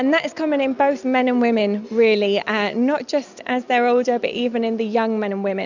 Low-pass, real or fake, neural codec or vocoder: 7.2 kHz; real; none